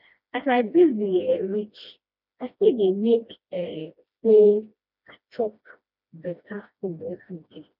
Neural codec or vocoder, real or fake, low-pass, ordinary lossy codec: codec, 16 kHz, 1 kbps, FreqCodec, smaller model; fake; 5.4 kHz; none